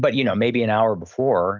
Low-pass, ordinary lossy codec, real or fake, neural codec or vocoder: 7.2 kHz; Opus, 24 kbps; real; none